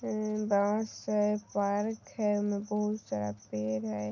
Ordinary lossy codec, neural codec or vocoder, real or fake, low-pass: none; none; real; 7.2 kHz